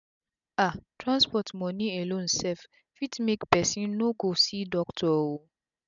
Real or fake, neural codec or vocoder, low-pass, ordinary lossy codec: real; none; 7.2 kHz; none